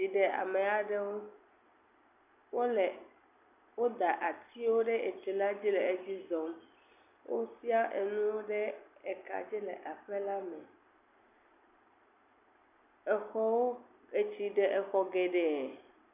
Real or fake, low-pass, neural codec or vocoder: real; 3.6 kHz; none